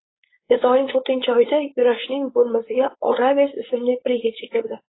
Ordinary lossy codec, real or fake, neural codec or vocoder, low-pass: AAC, 16 kbps; fake; codec, 16 kHz, 4.8 kbps, FACodec; 7.2 kHz